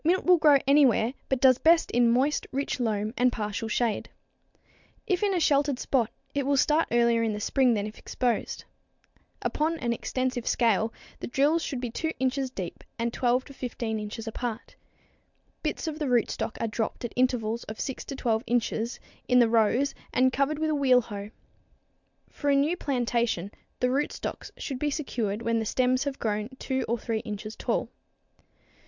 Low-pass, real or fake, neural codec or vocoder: 7.2 kHz; real; none